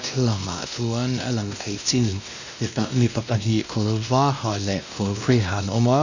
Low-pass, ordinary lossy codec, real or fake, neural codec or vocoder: 7.2 kHz; none; fake; codec, 16 kHz, 1 kbps, X-Codec, WavLM features, trained on Multilingual LibriSpeech